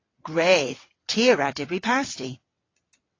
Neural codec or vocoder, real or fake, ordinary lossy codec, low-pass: vocoder, 22.05 kHz, 80 mel bands, WaveNeXt; fake; AAC, 32 kbps; 7.2 kHz